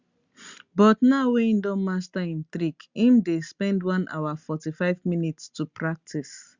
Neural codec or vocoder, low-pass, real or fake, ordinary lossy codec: none; 7.2 kHz; real; Opus, 64 kbps